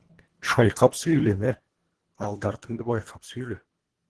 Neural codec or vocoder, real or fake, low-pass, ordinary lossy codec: codec, 24 kHz, 1.5 kbps, HILCodec; fake; 10.8 kHz; Opus, 16 kbps